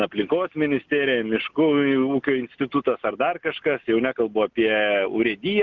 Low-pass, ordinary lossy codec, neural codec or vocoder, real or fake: 7.2 kHz; Opus, 32 kbps; none; real